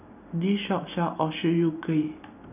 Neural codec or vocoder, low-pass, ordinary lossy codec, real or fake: none; 3.6 kHz; none; real